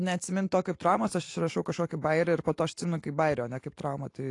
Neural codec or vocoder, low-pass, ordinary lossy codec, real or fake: none; 10.8 kHz; AAC, 48 kbps; real